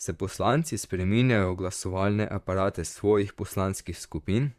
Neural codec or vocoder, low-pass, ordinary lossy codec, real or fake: vocoder, 44.1 kHz, 128 mel bands, Pupu-Vocoder; 14.4 kHz; Opus, 64 kbps; fake